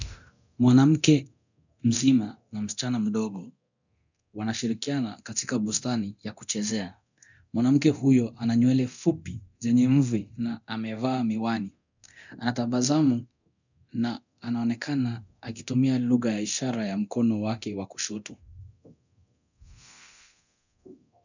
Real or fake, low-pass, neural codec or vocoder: fake; 7.2 kHz; codec, 24 kHz, 0.9 kbps, DualCodec